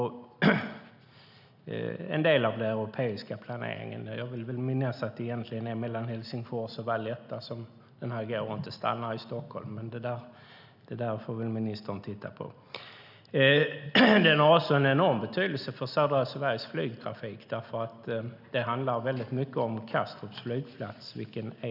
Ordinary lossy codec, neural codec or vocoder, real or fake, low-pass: none; none; real; 5.4 kHz